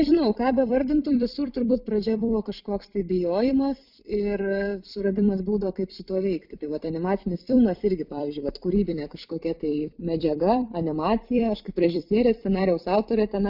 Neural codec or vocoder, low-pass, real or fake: vocoder, 44.1 kHz, 128 mel bands every 256 samples, BigVGAN v2; 5.4 kHz; fake